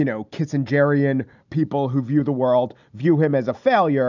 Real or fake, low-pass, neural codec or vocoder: real; 7.2 kHz; none